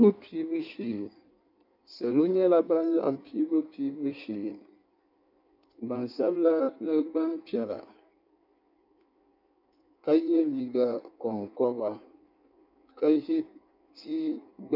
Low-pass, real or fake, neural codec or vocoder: 5.4 kHz; fake; codec, 16 kHz in and 24 kHz out, 1.1 kbps, FireRedTTS-2 codec